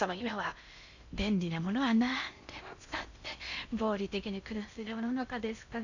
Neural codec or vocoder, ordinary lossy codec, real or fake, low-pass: codec, 16 kHz in and 24 kHz out, 0.6 kbps, FocalCodec, streaming, 4096 codes; none; fake; 7.2 kHz